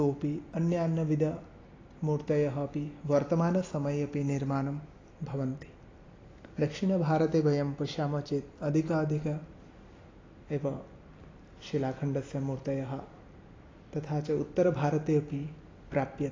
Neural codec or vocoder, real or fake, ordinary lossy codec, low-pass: none; real; AAC, 32 kbps; 7.2 kHz